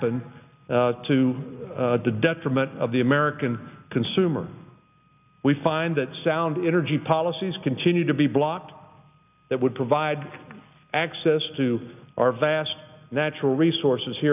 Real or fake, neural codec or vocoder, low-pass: real; none; 3.6 kHz